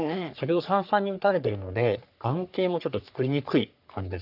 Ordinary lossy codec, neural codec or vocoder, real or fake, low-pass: none; codec, 44.1 kHz, 3.4 kbps, Pupu-Codec; fake; 5.4 kHz